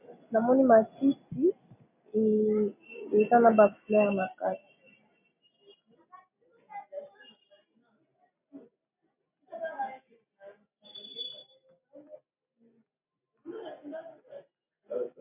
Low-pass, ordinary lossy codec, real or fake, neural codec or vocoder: 3.6 kHz; MP3, 32 kbps; real; none